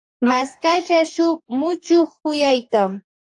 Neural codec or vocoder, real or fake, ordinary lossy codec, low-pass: codec, 44.1 kHz, 2.6 kbps, DAC; fake; AAC, 64 kbps; 10.8 kHz